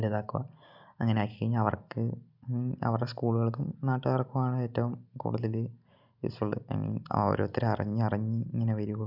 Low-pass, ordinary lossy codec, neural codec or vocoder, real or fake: 5.4 kHz; none; none; real